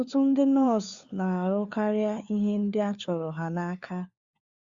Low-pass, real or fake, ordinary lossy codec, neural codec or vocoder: 7.2 kHz; fake; Opus, 64 kbps; codec, 16 kHz, 4 kbps, FunCodec, trained on LibriTTS, 50 frames a second